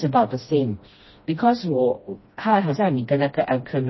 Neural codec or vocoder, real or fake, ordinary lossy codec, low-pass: codec, 16 kHz, 1 kbps, FreqCodec, smaller model; fake; MP3, 24 kbps; 7.2 kHz